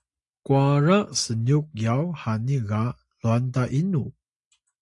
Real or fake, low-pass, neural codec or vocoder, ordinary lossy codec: real; 10.8 kHz; none; AAC, 64 kbps